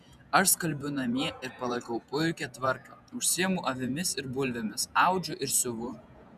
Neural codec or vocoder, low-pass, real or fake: vocoder, 48 kHz, 128 mel bands, Vocos; 14.4 kHz; fake